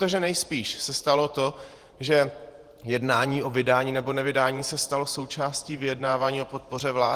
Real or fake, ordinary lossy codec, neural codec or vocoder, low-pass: fake; Opus, 24 kbps; vocoder, 48 kHz, 128 mel bands, Vocos; 14.4 kHz